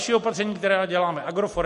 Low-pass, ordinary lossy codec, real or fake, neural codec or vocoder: 14.4 kHz; MP3, 48 kbps; real; none